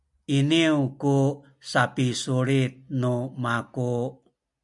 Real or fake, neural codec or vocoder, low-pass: real; none; 10.8 kHz